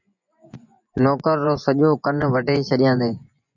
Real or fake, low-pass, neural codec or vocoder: fake; 7.2 kHz; vocoder, 24 kHz, 100 mel bands, Vocos